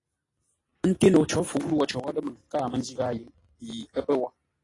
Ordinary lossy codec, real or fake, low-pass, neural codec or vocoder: AAC, 32 kbps; real; 10.8 kHz; none